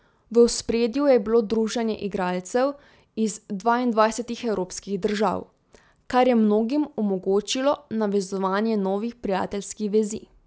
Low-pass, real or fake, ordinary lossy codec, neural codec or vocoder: none; real; none; none